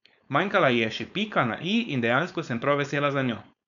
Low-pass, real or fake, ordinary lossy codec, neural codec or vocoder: 7.2 kHz; fake; none; codec, 16 kHz, 4.8 kbps, FACodec